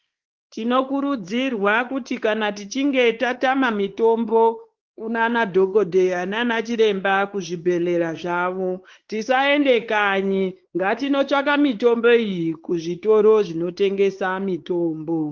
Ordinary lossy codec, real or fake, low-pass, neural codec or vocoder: Opus, 16 kbps; fake; 7.2 kHz; codec, 16 kHz, 4 kbps, X-Codec, WavLM features, trained on Multilingual LibriSpeech